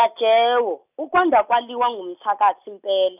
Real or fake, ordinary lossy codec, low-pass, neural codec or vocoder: real; none; 3.6 kHz; none